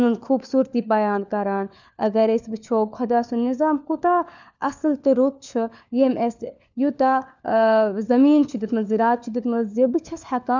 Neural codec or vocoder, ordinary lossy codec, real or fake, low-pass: codec, 16 kHz, 4 kbps, FunCodec, trained on LibriTTS, 50 frames a second; none; fake; 7.2 kHz